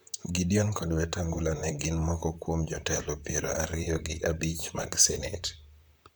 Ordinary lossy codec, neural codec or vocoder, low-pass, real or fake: none; vocoder, 44.1 kHz, 128 mel bands, Pupu-Vocoder; none; fake